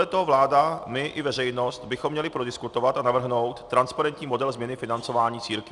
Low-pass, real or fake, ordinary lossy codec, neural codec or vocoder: 10.8 kHz; real; Opus, 64 kbps; none